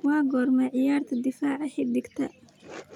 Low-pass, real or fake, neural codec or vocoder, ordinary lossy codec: 19.8 kHz; real; none; none